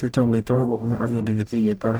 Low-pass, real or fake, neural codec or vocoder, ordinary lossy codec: none; fake; codec, 44.1 kHz, 0.9 kbps, DAC; none